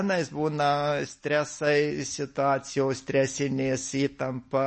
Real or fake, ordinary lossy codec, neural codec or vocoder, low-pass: real; MP3, 32 kbps; none; 10.8 kHz